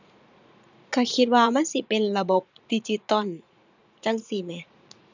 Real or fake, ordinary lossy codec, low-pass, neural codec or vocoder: fake; none; 7.2 kHz; vocoder, 44.1 kHz, 80 mel bands, Vocos